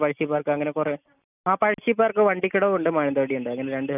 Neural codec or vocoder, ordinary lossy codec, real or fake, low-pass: none; none; real; 3.6 kHz